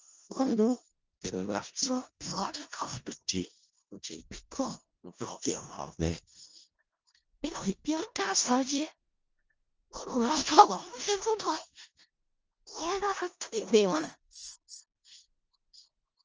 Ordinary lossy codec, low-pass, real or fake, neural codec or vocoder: Opus, 24 kbps; 7.2 kHz; fake; codec, 16 kHz in and 24 kHz out, 0.4 kbps, LongCat-Audio-Codec, four codebook decoder